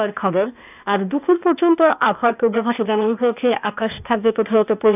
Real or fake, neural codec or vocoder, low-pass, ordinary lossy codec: fake; codec, 16 kHz, 0.8 kbps, ZipCodec; 3.6 kHz; none